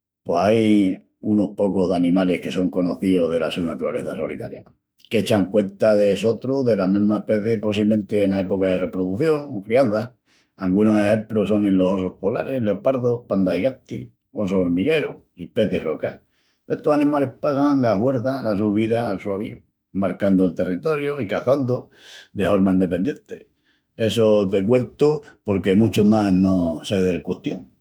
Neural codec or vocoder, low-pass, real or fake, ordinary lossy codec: autoencoder, 48 kHz, 32 numbers a frame, DAC-VAE, trained on Japanese speech; none; fake; none